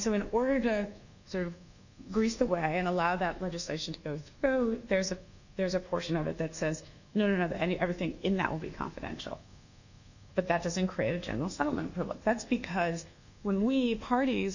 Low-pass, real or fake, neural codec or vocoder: 7.2 kHz; fake; codec, 24 kHz, 1.2 kbps, DualCodec